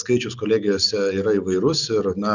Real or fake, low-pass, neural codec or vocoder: real; 7.2 kHz; none